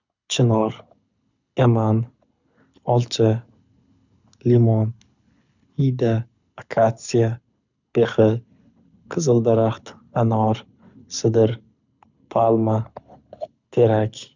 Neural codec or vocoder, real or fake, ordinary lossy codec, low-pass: codec, 24 kHz, 6 kbps, HILCodec; fake; none; 7.2 kHz